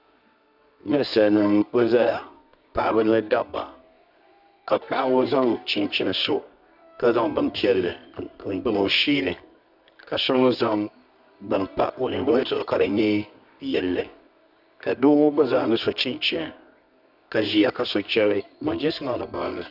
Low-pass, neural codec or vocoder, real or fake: 5.4 kHz; codec, 24 kHz, 0.9 kbps, WavTokenizer, medium music audio release; fake